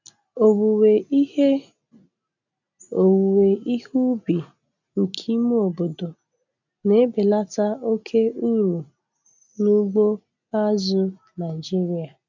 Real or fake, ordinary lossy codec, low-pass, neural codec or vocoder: real; none; 7.2 kHz; none